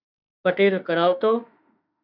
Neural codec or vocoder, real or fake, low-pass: autoencoder, 48 kHz, 32 numbers a frame, DAC-VAE, trained on Japanese speech; fake; 5.4 kHz